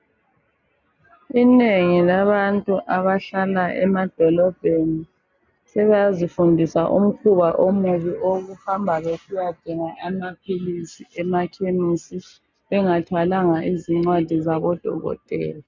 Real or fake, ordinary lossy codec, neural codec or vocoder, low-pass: real; MP3, 64 kbps; none; 7.2 kHz